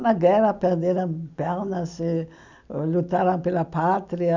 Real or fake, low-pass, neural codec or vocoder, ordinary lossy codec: real; 7.2 kHz; none; none